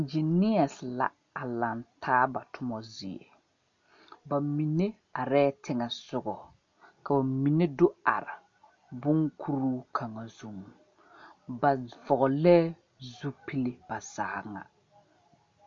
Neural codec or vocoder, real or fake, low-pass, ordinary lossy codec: none; real; 7.2 kHz; MP3, 48 kbps